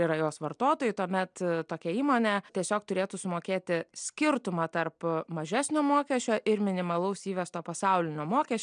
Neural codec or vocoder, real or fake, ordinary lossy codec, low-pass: none; real; MP3, 96 kbps; 9.9 kHz